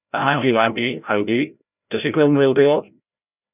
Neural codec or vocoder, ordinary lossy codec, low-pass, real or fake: codec, 16 kHz, 0.5 kbps, FreqCodec, larger model; none; 3.6 kHz; fake